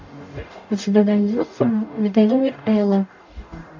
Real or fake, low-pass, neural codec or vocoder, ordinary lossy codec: fake; 7.2 kHz; codec, 44.1 kHz, 0.9 kbps, DAC; AAC, 48 kbps